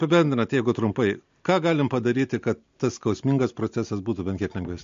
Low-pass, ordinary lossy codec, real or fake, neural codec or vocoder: 7.2 kHz; MP3, 48 kbps; real; none